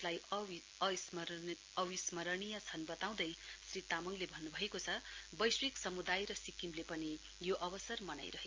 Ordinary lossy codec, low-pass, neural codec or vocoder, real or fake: Opus, 24 kbps; 7.2 kHz; none; real